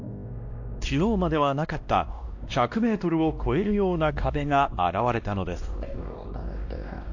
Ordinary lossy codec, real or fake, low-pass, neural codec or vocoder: AAC, 48 kbps; fake; 7.2 kHz; codec, 16 kHz, 1 kbps, X-Codec, WavLM features, trained on Multilingual LibriSpeech